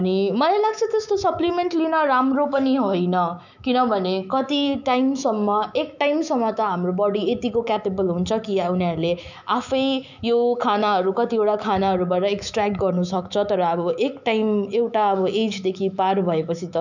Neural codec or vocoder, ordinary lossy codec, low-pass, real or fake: autoencoder, 48 kHz, 128 numbers a frame, DAC-VAE, trained on Japanese speech; none; 7.2 kHz; fake